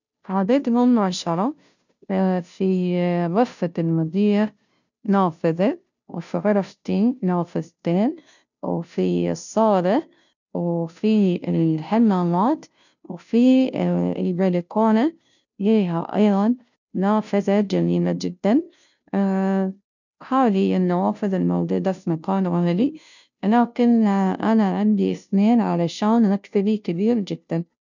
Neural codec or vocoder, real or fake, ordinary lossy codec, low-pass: codec, 16 kHz, 0.5 kbps, FunCodec, trained on Chinese and English, 25 frames a second; fake; none; 7.2 kHz